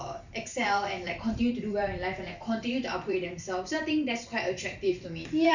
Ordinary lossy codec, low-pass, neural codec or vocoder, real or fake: none; 7.2 kHz; vocoder, 44.1 kHz, 128 mel bands every 256 samples, BigVGAN v2; fake